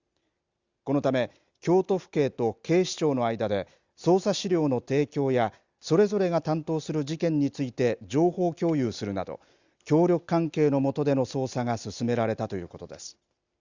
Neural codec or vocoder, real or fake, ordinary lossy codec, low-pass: none; real; Opus, 64 kbps; 7.2 kHz